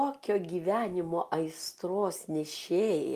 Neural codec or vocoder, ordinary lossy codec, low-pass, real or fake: none; Opus, 32 kbps; 14.4 kHz; real